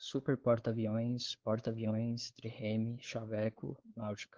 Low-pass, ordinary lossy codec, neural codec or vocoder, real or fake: 7.2 kHz; Opus, 16 kbps; codec, 16 kHz, 4 kbps, X-Codec, WavLM features, trained on Multilingual LibriSpeech; fake